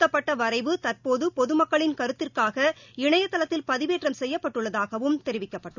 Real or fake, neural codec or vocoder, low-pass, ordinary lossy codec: real; none; 7.2 kHz; none